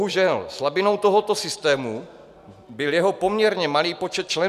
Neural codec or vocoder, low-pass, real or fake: none; 14.4 kHz; real